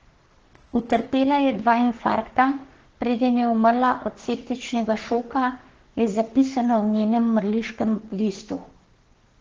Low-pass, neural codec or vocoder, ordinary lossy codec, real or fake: 7.2 kHz; codec, 44.1 kHz, 3.4 kbps, Pupu-Codec; Opus, 16 kbps; fake